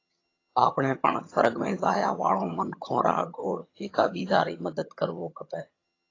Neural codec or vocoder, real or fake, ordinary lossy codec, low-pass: vocoder, 22.05 kHz, 80 mel bands, HiFi-GAN; fake; AAC, 32 kbps; 7.2 kHz